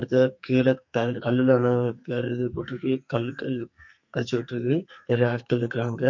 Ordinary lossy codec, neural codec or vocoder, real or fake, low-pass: MP3, 48 kbps; codec, 44.1 kHz, 2.6 kbps, SNAC; fake; 7.2 kHz